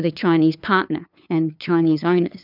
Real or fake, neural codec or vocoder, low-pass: fake; codec, 16 kHz, 2 kbps, FunCodec, trained on LibriTTS, 25 frames a second; 5.4 kHz